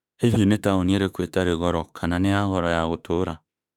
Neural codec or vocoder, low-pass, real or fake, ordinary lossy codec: autoencoder, 48 kHz, 32 numbers a frame, DAC-VAE, trained on Japanese speech; 19.8 kHz; fake; none